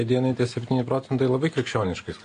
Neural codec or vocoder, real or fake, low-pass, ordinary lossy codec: none; real; 9.9 kHz; AAC, 48 kbps